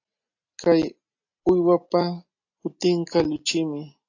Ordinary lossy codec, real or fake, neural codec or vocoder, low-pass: AAC, 48 kbps; real; none; 7.2 kHz